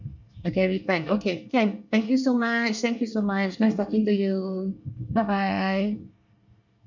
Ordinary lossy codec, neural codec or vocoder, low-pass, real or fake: none; codec, 24 kHz, 1 kbps, SNAC; 7.2 kHz; fake